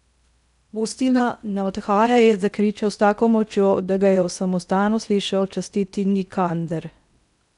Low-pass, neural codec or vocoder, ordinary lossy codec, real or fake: 10.8 kHz; codec, 16 kHz in and 24 kHz out, 0.6 kbps, FocalCodec, streaming, 4096 codes; none; fake